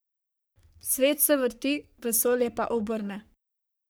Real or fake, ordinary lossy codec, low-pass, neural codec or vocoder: fake; none; none; codec, 44.1 kHz, 3.4 kbps, Pupu-Codec